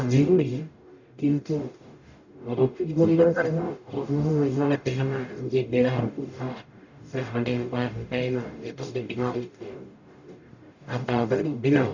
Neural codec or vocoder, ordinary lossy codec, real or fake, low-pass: codec, 44.1 kHz, 0.9 kbps, DAC; none; fake; 7.2 kHz